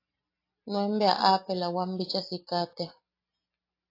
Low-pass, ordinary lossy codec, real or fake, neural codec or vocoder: 5.4 kHz; AAC, 32 kbps; real; none